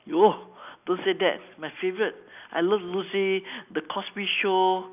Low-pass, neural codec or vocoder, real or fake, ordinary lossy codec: 3.6 kHz; none; real; none